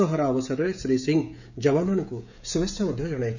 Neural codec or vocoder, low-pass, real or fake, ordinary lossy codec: codec, 16 kHz, 16 kbps, FreqCodec, smaller model; 7.2 kHz; fake; none